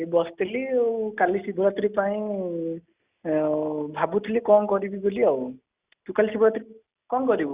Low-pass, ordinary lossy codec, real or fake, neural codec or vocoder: 3.6 kHz; Opus, 64 kbps; real; none